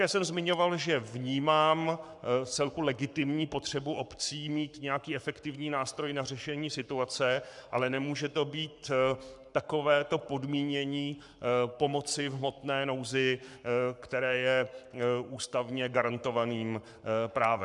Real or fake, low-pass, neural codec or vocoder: fake; 10.8 kHz; codec, 44.1 kHz, 7.8 kbps, Pupu-Codec